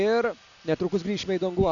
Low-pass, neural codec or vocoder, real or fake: 7.2 kHz; none; real